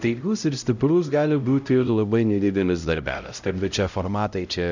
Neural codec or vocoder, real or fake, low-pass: codec, 16 kHz, 0.5 kbps, X-Codec, HuBERT features, trained on LibriSpeech; fake; 7.2 kHz